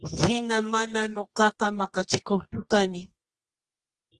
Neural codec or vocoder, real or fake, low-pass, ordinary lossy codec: codec, 24 kHz, 0.9 kbps, WavTokenizer, medium music audio release; fake; 10.8 kHz; Opus, 24 kbps